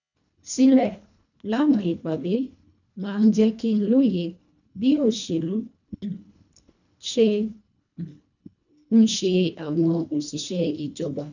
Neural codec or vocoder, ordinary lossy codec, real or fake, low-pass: codec, 24 kHz, 1.5 kbps, HILCodec; none; fake; 7.2 kHz